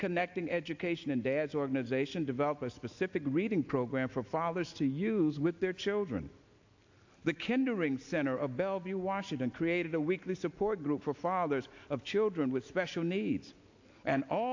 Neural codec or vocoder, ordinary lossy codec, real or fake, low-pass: none; AAC, 48 kbps; real; 7.2 kHz